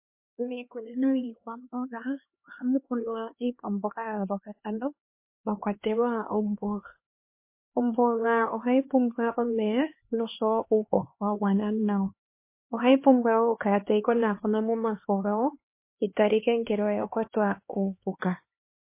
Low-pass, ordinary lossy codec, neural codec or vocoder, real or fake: 3.6 kHz; MP3, 24 kbps; codec, 16 kHz, 2 kbps, X-Codec, HuBERT features, trained on LibriSpeech; fake